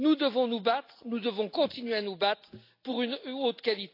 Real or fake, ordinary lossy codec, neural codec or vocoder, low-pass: real; AAC, 32 kbps; none; 5.4 kHz